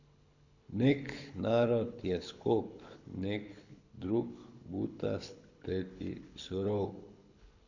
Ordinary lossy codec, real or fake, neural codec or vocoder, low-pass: none; fake; codec, 24 kHz, 6 kbps, HILCodec; 7.2 kHz